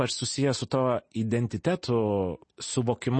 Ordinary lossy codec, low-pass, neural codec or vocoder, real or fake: MP3, 32 kbps; 9.9 kHz; none; real